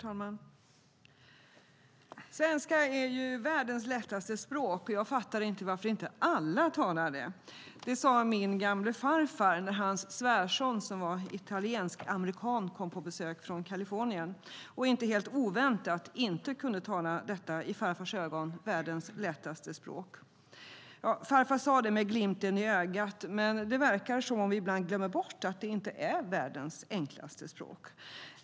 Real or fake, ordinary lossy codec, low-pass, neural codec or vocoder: real; none; none; none